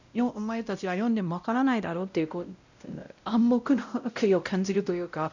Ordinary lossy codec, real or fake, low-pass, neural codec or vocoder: none; fake; 7.2 kHz; codec, 16 kHz, 0.5 kbps, X-Codec, WavLM features, trained on Multilingual LibriSpeech